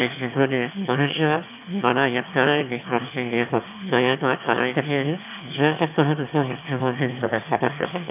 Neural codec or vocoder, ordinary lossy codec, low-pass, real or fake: autoencoder, 22.05 kHz, a latent of 192 numbers a frame, VITS, trained on one speaker; none; 3.6 kHz; fake